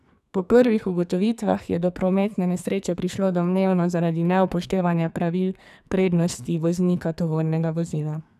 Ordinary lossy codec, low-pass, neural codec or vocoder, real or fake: none; 14.4 kHz; codec, 44.1 kHz, 2.6 kbps, SNAC; fake